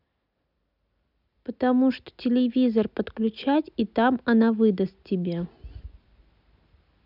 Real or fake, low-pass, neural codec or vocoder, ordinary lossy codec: real; 5.4 kHz; none; none